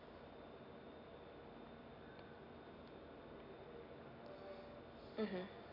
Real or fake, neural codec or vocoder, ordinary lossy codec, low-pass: real; none; MP3, 48 kbps; 5.4 kHz